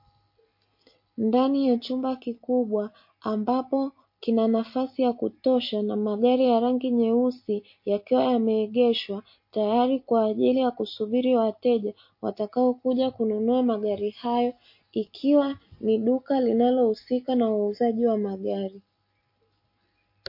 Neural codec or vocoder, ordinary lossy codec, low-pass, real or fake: none; MP3, 32 kbps; 5.4 kHz; real